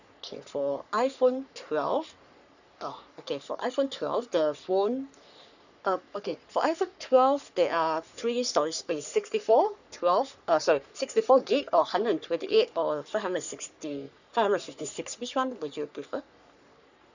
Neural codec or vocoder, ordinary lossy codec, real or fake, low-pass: codec, 44.1 kHz, 3.4 kbps, Pupu-Codec; none; fake; 7.2 kHz